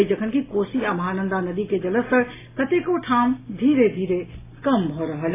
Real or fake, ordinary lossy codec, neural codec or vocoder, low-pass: real; AAC, 16 kbps; none; 3.6 kHz